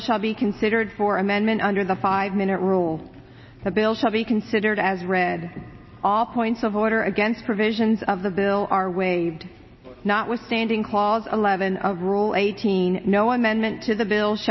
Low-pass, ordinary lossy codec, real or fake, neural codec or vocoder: 7.2 kHz; MP3, 24 kbps; real; none